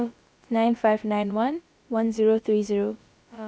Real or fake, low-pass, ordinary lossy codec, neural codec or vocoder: fake; none; none; codec, 16 kHz, about 1 kbps, DyCAST, with the encoder's durations